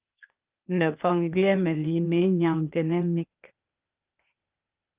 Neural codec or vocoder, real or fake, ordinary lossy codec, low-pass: codec, 16 kHz, 0.8 kbps, ZipCodec; fake; Opus, 32 kbps; 3.6 kHz